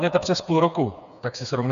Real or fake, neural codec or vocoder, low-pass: fake; codec, 16 kHz, 4 kbps, FreqCodec, smaller model; 7.2 kHz